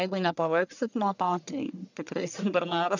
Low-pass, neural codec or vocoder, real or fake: 7.2 kHz; codec, 44.1 kHz, 1.7 kbps, Pupu-Codec; fake